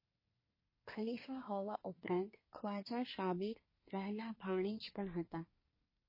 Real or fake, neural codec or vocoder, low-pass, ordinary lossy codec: fake; codec, 24 kHz, 1 kbps, SNAC; 5.4 kHz; MP3, 24 kbps